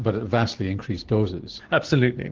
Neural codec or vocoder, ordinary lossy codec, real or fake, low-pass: none; Opus, 16 kbps; real; 7.2 kHz